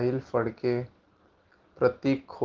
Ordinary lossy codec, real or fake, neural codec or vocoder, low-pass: Opus, 16 kbps; real; none; 7.2 kHz